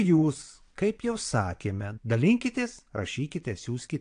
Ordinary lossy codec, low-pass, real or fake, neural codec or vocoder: AAC, 64 kbps; 9.9 kHz; fake; vocoder, 22.05 kHz, 80 mel bands, WaveNeXt